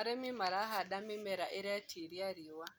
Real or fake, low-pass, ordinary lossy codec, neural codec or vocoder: real; none; none; none